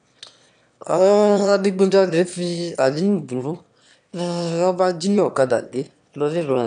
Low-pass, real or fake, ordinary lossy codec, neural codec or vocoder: 9.9 kHz; fake; none; autoencoder, 22.05 kHz, a latent of 192 numbers a frame, VITS, trained on one speaker